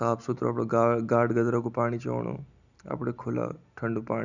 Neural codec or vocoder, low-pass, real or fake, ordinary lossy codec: none; 7.2 kHz; real; none